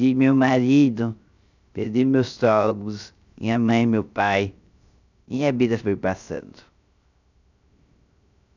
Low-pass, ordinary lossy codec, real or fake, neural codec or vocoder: 7.2 kHz; none; fake; codec, 16 kHz, 0.3 kbps, FocalCodec